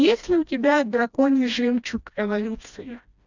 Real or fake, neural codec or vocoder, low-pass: fake; codec, 16 kHz, 1 kbps, FreqCodec, smaller model; 7.2 kHz